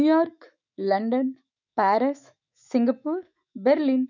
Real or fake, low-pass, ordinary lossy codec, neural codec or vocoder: fake; 7.2 kHz; none; autoencoder, 48 kHz, 128 numbers a frame, DAC-VAE, trained on Japanese speech